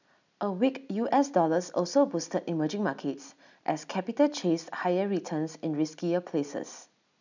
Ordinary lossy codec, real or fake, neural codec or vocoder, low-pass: none; real; none; 7.2 kHz